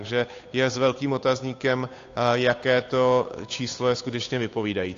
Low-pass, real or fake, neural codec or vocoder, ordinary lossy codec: 7.2 kHz; fake; codec, 16 kHz, 8 kbps, FunCodec, trained on Chinese and English, 25 frames a second; AAC, 48 kbps